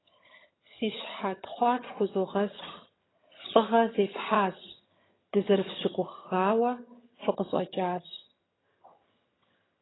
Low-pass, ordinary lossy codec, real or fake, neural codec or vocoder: 7.2 kHz; AAC, 16 kbps; fake; vocoder, 22.05 kHz, 80 mel bands, HiFi-GAN